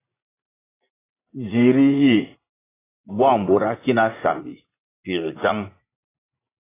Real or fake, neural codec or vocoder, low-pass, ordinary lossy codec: fake; vocoder, 44.1 kHz, 80 mel bands, Vocos; 3.6 kHz; AAC, 16 kbps